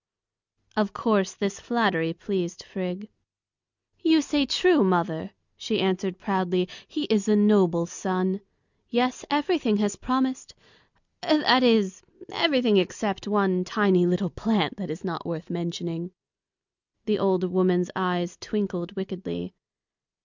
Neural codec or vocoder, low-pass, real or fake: none; 7.2 kHz; real